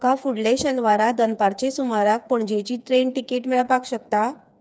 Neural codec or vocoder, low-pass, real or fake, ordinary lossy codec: codec, 16 kHz, 4 kbps, FreqCodec, smaller model; none; fake; none